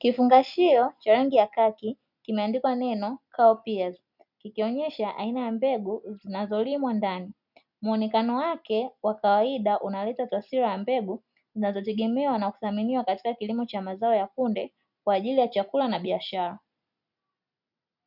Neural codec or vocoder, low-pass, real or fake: none; 5.4 kHz; real